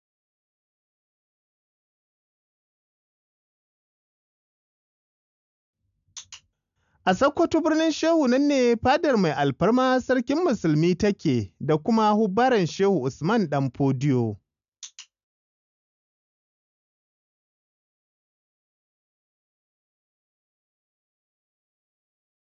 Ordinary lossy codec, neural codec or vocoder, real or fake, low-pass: none; none; real; 7.2 kHz